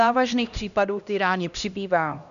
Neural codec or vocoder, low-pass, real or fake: codec, 16 kHz, 1 kbps, X-Codec, HuBERT features, trained on LibriSpeech; 7.2 kHz; fake